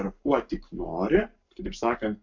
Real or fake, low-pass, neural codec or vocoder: fake; 7.2 kHz; codec, 44.1 kHz, 7.8 kbps, Pupu-Codec